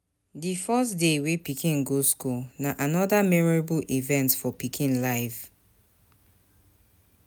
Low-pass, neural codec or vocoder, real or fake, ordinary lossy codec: none; none; real; none